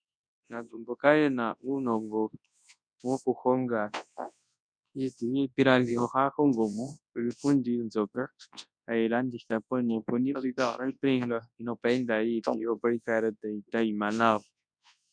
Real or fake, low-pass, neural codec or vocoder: fake; 9.9 kHz; codec, 24 kHz, 0.9 kbps, WavTokenizer, large speech release